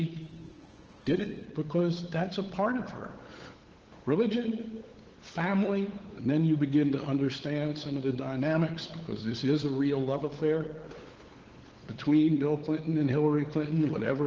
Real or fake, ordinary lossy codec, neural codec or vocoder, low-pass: fake; Opus, 16 kbps; codec, 16 kHz, 8 kbps, FunCodec, trained on LibriTTS, 25 frames a second; 7.2 kHz